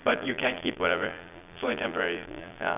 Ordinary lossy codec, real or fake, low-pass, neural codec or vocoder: none; fake; 3.6 kHz; vocoder, 22.05 kHz, 80 mel bands, Vocos